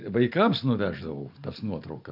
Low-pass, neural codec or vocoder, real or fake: 5.4 kHz; none; real